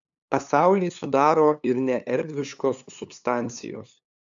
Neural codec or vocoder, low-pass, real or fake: codec, 16 kHz, 2 kbps, FunCodec, trained on LibriTTS, 25 frames a second; 7.2 kHz; fake